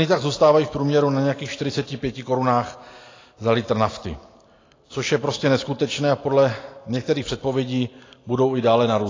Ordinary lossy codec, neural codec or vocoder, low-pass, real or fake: AAC, 32 kbps; none; 7.2 kHz; real